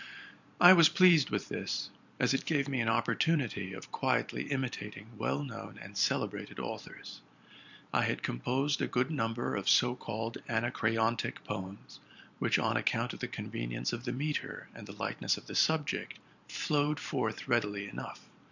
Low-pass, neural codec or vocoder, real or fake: 7.2 kHz; vocoder, 44.1 kHz, 128 mel bands every 512 samples, BigVGAN v2; fake